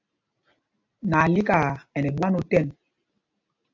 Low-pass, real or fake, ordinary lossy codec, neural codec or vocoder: 7.2 kHz; real; AAC, 48 kbps; none